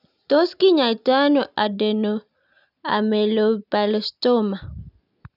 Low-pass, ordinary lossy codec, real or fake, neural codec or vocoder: 5.4 kHz; none; real; none